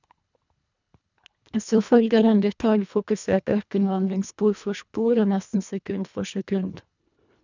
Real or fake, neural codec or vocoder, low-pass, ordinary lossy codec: fake; codec, 24 kHz, 1.5 kbps, HILCodec; 7.2 kHz; none